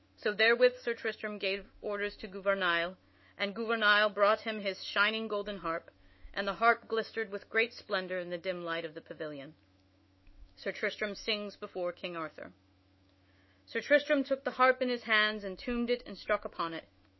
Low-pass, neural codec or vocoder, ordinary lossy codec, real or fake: 7.2 kHz; autoencoder, 48 kHz, 128 numbers a frame, DAC-VAE, trained on Japanese speech; MP3, 24 kbps; fake